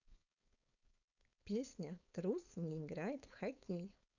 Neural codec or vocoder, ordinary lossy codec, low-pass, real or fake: codec, 16 kHz, 4.8 kbps, FACodec; none; 7.2 kHz; fake